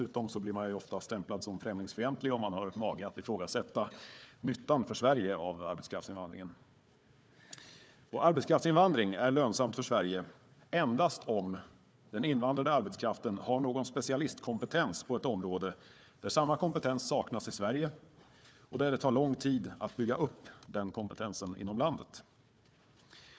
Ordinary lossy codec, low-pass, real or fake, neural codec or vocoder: none; none; fake; codec, 16 kHz, 4 kbps, FunCodec, trained on Chinese and English, 50 frames a second